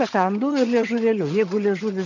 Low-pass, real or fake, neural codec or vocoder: 7.2 kHz; fake; vocoder, 22.05 kHz, 80 mel bands, HiFi-GAN